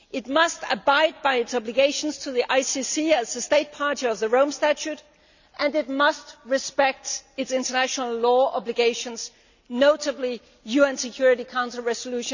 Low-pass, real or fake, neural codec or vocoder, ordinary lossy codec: 7.2 kHz; real; none; none